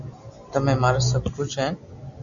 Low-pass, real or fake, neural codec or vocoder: 7.2 kHz; real; none